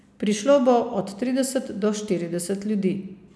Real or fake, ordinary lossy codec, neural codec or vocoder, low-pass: real; none; none; none